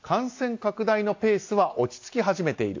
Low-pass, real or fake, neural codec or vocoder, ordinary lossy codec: 7.2 kHz; real; none; AAC, 48 kbps